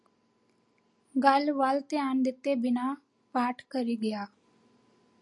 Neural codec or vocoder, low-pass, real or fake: none; 10.8 kHz; real